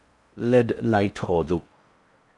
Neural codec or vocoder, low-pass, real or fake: codec, 16 kHz in and 24 kHz out, 0.6 kbps, FocalCodec, streaming, 4096 codes; 10.8 kHz; fake